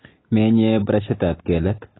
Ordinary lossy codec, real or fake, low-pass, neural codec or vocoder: AAC, 16 kbps; real; 7.2 kHz; none